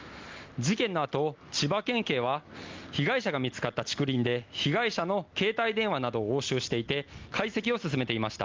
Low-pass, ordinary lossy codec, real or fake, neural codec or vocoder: 7.2 kHz; Opus, 24 kbps; real; none